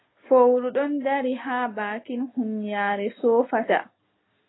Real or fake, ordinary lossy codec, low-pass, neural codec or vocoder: real; AAC, 16 kbps; 7.2 kHz; none